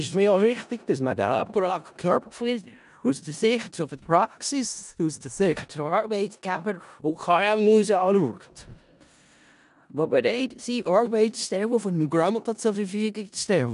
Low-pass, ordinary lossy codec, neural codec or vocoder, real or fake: 10.8 kHz; none; codec, 16 kHz in and 24 kHz out, 0.4 kbps, LongCat-Audio-Codec, four codebook decoder; fake